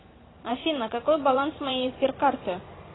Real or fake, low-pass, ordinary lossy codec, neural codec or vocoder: fake; 7.2 kHz; AAC, 16 kbps; vocoder, 44.1 kHz, 128 mel bands every 512 samples, BigVGAN v2